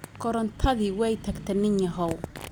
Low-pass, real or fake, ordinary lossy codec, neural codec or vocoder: none; real; none; none